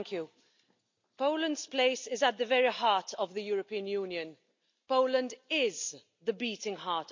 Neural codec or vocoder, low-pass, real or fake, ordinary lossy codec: none; 7.2 kHz; real; MP3, 64 kbps